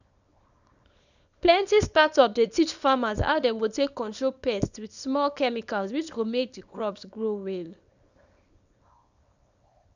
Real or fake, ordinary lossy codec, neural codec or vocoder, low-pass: fake; none; codec, 24 kHz, 0.9 kbps, WavTokenizer, small release; 7.2 kHz